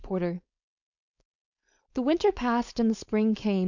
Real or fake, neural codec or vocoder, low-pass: fake; codec, 16 kHz, 4.8 kbps, FACodec; 7.2 kHz